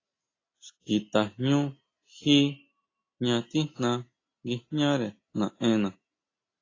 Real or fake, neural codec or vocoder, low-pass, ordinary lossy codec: real; none; 7.2 kHz; AAC, 32 kbps